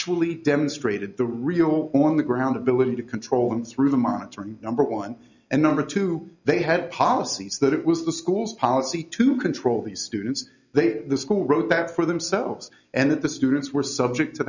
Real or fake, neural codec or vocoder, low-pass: real; none; 7.2 kHz